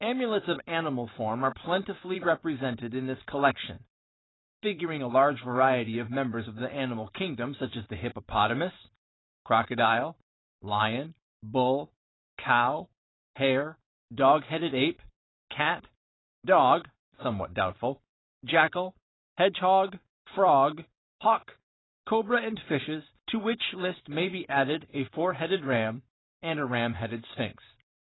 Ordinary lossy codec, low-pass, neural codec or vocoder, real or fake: AAC, 16 kbps; 7.2 kHz; vocoder, 44.1 kHz, 128 mel bands every 512 samples, BigVGAN v2; fake